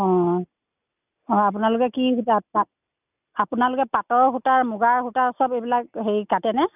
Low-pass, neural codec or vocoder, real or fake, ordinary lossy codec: 3.6 kHz; none; real; none